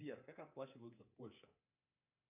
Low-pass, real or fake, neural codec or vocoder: 3.6 kHz; fake; codec, 24 kHz, 3.1 kbps, DualCodec